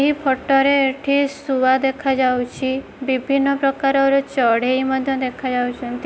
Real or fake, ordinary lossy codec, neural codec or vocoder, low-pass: real; none; none; none